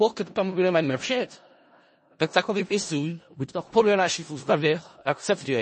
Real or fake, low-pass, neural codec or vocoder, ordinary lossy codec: fake; 10.8 kHz; codec, 16 kHz in and 24 kHz out, 0.4 kbps, LongCat-Audio-Codec, four codebook decoder; MP3, 32 kbps